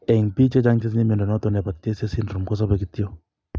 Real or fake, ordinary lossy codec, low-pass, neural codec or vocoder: real; none; none; none